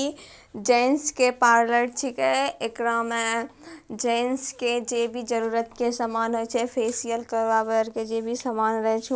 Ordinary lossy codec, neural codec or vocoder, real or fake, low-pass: none; none; real; none